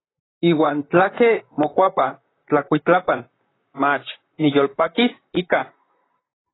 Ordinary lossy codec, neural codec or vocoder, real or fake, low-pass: AAC, 16 kbps; vocoder, 44.1 kHz, 128 mel bands, Pupu-Vocoder; fake; 7.2 kHz